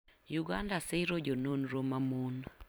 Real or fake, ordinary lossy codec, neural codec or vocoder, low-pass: fake; none; vocoder, 44.1 kHz, 128 mel bands every 256 samples, BigVGAN v2; none